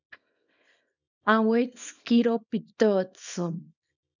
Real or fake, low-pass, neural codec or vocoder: fake; 7.2 kHz; codec, 24 kHz, 0.9 kbps, WavTokenizer, small release